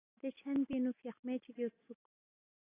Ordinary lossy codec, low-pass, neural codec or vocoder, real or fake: AAC, 16 kbps; 3.6 kHz; none; real